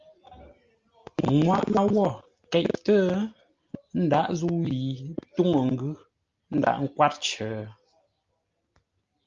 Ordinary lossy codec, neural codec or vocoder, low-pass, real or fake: Opus, 32 kbps; none; 7.2 kHz; real